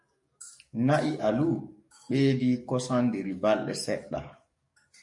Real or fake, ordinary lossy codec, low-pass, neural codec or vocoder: fake; MP3, 48 kbps; 10.8 kHz; codec, 44.1 kHz, 7.8 kbps, DAC